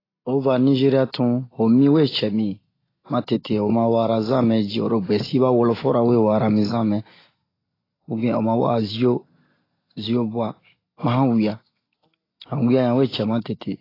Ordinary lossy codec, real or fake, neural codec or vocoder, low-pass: AAC, 24 kbps; real; none; 5.4 kHz